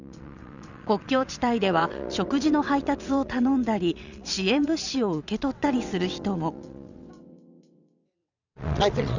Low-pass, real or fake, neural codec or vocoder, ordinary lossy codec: 7.2 kHz; fake; vocoder, 22.05 kHz, 80 mel bands, WaveNeXt; none